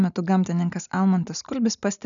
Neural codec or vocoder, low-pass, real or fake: none; 7.2 kHz; real